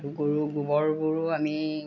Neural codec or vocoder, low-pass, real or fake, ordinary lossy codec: none; 7.2 kHz; real; none